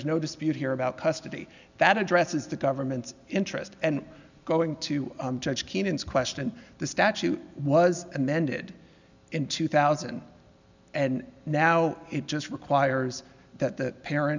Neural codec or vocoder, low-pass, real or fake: none; 7.2 kHz; real